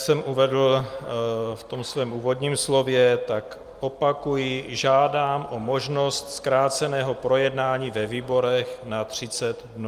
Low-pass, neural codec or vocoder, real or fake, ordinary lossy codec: 14.4 kHz; none; real; Opus, 32 kbps